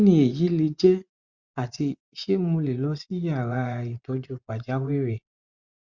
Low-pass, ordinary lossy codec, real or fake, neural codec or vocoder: 7.2 kHz; Opus, 64 kbps; fake; vocoder, 24 kHz, 100 mel bands, Vocos